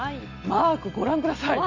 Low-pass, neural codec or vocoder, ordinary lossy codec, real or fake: 7.2 kHz; none; Opus, 64 kbps; real